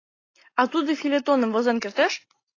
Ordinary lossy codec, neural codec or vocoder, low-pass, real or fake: AAC, 32 kbps; none; 7.2 kHz; real